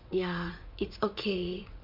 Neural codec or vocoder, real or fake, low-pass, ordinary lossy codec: vocoder, 44.1 kHz, 80 mel bands, Vocos; fake; 5.4 kHz; MP3, 48 kbps